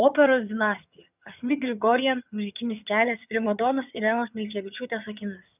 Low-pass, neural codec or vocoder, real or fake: 3.6 kHz; vocoder, 22.05 kHz, 80 mel bands, HiFi-GAN; fake